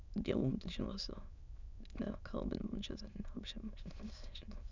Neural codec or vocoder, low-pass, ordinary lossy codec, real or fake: autoencoder, 22.05 kHz, a latent of 192 numbers a frame, VITS, trained on many speakers; 7.2 kHz; none; fake